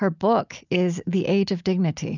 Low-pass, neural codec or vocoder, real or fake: 7.2 kHz; none; real